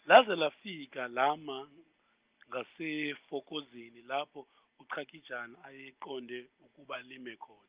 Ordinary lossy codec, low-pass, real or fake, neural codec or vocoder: Opus, 32 kbps; 3.6 kHz; real; none